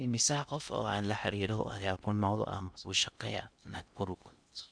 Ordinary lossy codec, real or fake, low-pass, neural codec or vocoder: none; fake; 9.9 kHz; codec, 16 kHz in and 24 kHz out, 0.6 kbps, FocalCodec, streaming, 2048 codes